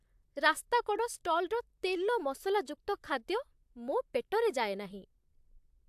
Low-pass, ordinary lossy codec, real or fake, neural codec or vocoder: 14.4 kHz; AAC, 96 kbps; fake; vocoder, 44.1 kHz, 128 mel bands, Pupu-Vocoder